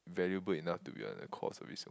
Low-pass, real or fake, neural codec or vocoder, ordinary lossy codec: none; real; none; none